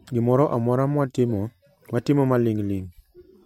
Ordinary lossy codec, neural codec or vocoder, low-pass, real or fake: MP3, 64 kbps; vocoder, 44.1 kHz, 128 mel bands every 512 samples, BigVGAN v2; 19.8 kHz; fake